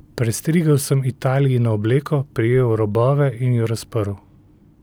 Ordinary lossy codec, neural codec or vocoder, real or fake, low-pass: none; none; real; none